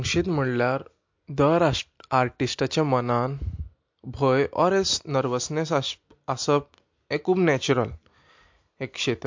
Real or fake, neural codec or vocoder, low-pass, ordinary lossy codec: real; none; 7.2 kHz; MP3, 48 kbps